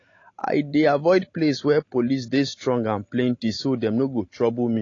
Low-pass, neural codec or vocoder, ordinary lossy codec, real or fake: 7.2 kHz; none; AAC, 32 kbps; real